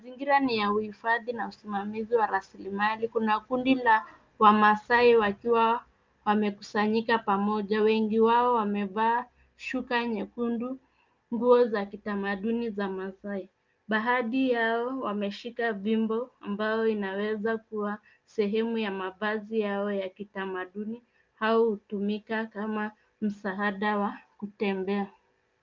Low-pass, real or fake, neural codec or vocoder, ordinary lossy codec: 7.2 kHz; real; none; Opus, 24 kbps